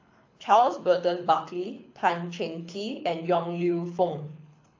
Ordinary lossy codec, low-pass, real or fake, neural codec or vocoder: AAC, 48 kbps; 7.2 kHz; fake; codec, 24 kHz, 6 kbps, HILCodec